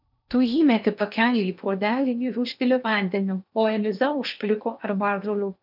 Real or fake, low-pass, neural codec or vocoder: fake; 5.4 kHz; codec, 16 kHz in and 24 kHz out, 0.8 kbps, FocalCodec, streaming, 65536 codes